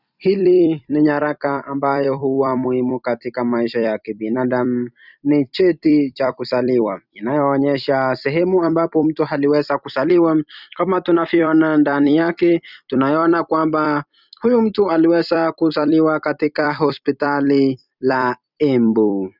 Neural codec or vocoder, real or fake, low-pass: vocoder, 44.1 kHz, 128 mel bands every 256 samples, BigVGAN v2; fake; 5.4 kHz